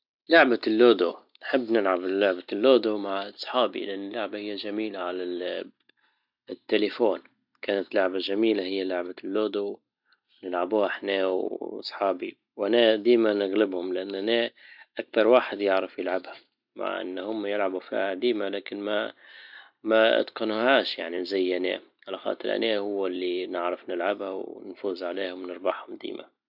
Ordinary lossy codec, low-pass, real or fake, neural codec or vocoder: none; 5.4 kHz; real; none